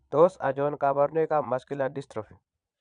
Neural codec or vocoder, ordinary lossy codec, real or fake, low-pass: vocoder, 22.05 kHz, 80 mel bands, Vocos; none; fake; 9.9 kHz